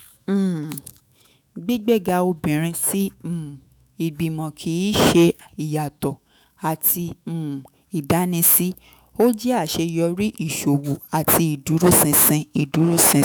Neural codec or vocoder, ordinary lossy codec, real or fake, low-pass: autoencoder, 48 kHz, 128 numbers a frame, DAC-VAE, trained on Japanese speech; none; fake; none